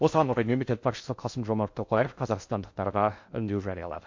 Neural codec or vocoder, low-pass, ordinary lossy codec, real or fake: codec, 16 kHz in and 24 kHz out, 0.6 kbps, FocalCodec, streaming, 2048 codes; 7.2 kHz; MP3, 48 kbps; fake